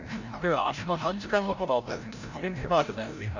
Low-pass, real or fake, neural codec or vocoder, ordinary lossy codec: 7.2 kHz; fake; codec, 16 kHz, 0.5 kbps, FreqCodec, larger model; none